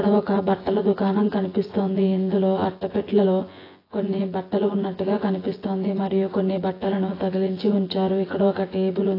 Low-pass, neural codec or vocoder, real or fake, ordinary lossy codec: 5.4 kHz; vocoder, 24 kHz, 100 mel bands, Vocos; fake; AAC, 24 kbps